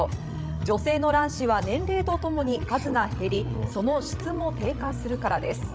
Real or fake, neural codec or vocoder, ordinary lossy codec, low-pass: fake; codec, 16 kHz, 16 kbps, FreqCodec, larger model; none; none